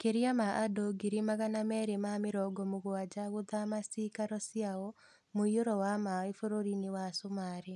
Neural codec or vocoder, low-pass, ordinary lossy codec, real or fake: none; none; none; real